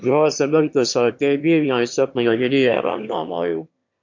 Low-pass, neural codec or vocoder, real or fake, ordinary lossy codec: 7.2 kHz; autoencoder, 22.05 kHz, a latent of 192 numbers a frame, VITS, trained on one speaker; fake; MP3, 48 kbps